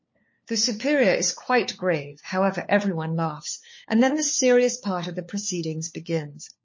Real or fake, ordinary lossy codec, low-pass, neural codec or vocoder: fake; MP3, 32 kbps; 7.2 kHz; codec, 16 kHz, 4 kbps, FunCodec, trained on LibriTTS, 50 frames a second